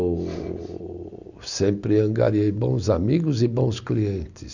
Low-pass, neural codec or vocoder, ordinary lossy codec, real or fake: 7.2 kHz; none; none; real